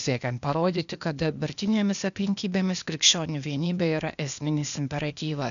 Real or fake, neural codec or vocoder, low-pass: fake; codec, 16 kHz, 0.8 kbps, ZipCodec; 7.2 kHz